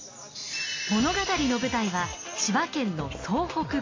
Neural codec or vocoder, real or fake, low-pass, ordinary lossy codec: none; real; 7.2 kHz; AAC, 32 kbps